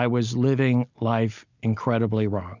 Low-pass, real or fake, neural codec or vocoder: 7.2 kHz; real; none